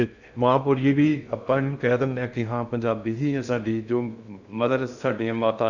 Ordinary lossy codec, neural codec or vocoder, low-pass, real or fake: none; codec, 16 kHz in and 24 kHz out, 0.6 kbps, FocalCodec, streaming, 2048 codes; 7.2 kHz; fake